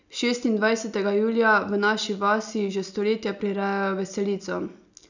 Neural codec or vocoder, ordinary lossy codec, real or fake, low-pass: none; none; real; 7.2 kHz